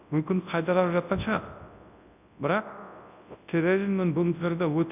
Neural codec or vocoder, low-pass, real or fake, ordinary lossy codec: codec, 24 kHz, 0.9 kbps, WavTokenizer, large speech release; 3.6 kHz; fake; none